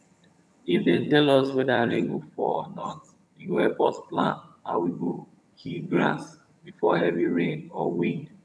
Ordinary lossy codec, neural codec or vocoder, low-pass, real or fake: none; vocoder, 22.05 kHz, 80 mel bands, HiFi-GAN; none; fake